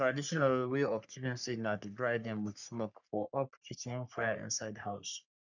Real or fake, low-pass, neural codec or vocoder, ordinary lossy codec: fake; 7.2 kHz; codec, 44.1 kHz, 3.4 kbps, Pupu-Codec; none